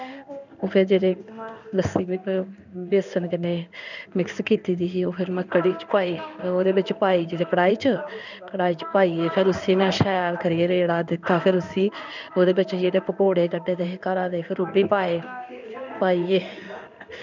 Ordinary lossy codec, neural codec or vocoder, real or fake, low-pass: none; codec, 16 kHz in and 24 kHz out, 1 kbps, XY-Tokenizer; fake; 7.2 kHz